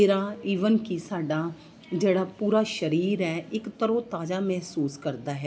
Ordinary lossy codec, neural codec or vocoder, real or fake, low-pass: none; none; real; none